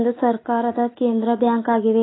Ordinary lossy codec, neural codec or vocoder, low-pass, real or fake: AAC, 16 kbps; none; 7.2 kHz; real